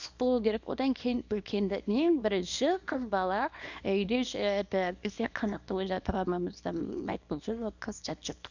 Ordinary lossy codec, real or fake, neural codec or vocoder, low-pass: none; fake; codec, 24 kHz, 0.9 kbps, WavTokenizer, small release; 7.2 kHz